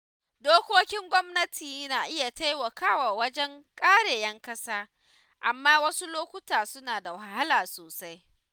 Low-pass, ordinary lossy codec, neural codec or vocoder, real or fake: none; none; none; real